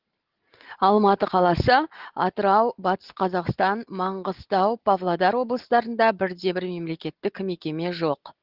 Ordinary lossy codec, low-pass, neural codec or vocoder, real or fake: Opus, 16 kbps; 5.4 kHz; none; real